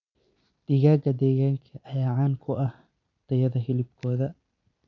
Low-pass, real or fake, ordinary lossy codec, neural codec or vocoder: 7.2 kHz; fake; none; vocoder, 44.1 kHz, 128 mel bands every 256 samples, BigVGAN v2